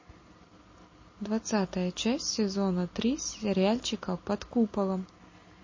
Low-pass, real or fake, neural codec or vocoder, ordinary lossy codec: 7.2 kHz; real; none; MP3, 32 kbps